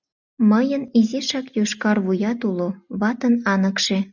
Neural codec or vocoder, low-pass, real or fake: none; 7.2 kHz; real